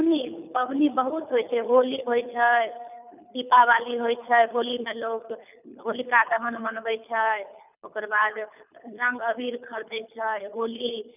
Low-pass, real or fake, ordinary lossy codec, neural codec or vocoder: 3.6 kHz; fake; none; codec, 24 kHz, 6 kbps, HILCodec